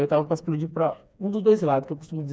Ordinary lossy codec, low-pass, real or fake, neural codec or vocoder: none; none; fake; codec, 16 kHz, 2 kbps, FreqCodec, smaller model